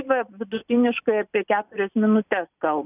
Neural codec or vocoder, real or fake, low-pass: none; real; 3.6 kHz